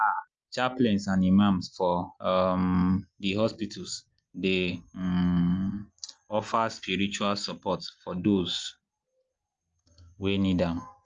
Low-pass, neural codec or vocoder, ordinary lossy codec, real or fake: 7.2 kHz; none; Opus, 24 kbps; real